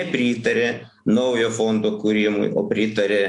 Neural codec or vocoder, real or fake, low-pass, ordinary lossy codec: none; real; 10.8 kHz; AAC, 64 kbps